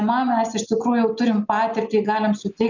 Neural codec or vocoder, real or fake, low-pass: none; real; 7.2 kHz